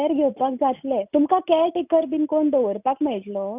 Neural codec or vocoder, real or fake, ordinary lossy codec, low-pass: none; real; none; 3.6 kHz